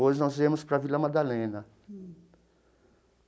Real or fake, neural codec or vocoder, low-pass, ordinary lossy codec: real; none; none; none